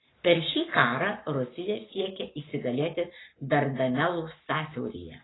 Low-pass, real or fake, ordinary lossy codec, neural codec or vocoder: 7.2 kHz; fake; AAC, 16 kbps; codec, 44.1 kHz, 7.8 kbps, Pupu-Codec